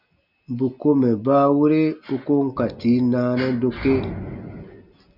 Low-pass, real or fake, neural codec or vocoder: 5.4 kHz; real; none